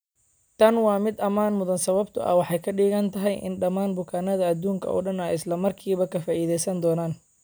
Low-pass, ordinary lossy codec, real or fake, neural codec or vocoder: none; none; real; none